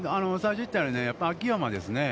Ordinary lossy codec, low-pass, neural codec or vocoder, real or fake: none; none; none; real